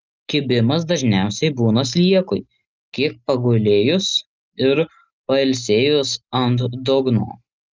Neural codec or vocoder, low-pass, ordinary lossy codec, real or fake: none; 7.2 kHz; Opus, 24 kbps; real